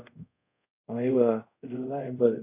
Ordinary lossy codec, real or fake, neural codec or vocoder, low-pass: AAC, 32 kbps; fake; codec, 24 kHz, 0.5 kbps, DualCodec; 3.6 kHz